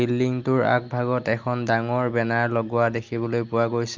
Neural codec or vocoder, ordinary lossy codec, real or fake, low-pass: none; Opus, 24 kbps; real; 7.2 kHz